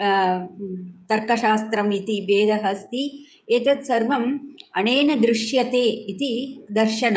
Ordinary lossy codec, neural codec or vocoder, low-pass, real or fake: none; codec, 16 kHz, 16 kbps, FreqCodec, smaller model; none; fake